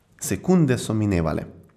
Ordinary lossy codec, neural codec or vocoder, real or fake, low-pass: none; none; real; 14.4 kHz